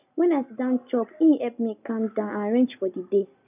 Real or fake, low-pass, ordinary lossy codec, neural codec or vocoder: real; 3.6 kHz; none; none